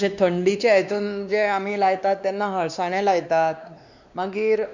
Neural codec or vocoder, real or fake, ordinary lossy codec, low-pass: codec, 16 kHz, 2 kbps, X-Codec, WavLM features, trained on Multilingual LibriSpeech; fake; none; 7.2 kHz